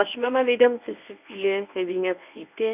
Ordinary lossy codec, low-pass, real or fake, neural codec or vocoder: none; 3.6 kHz; fake; codec, 24 kHz, 0.9 kbps, WavTokenizer, medium speech release version 1